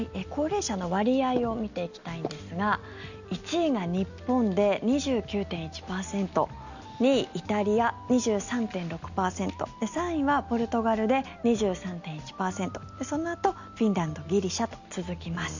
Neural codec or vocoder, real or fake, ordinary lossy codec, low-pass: none; real; none; 7.2 kHz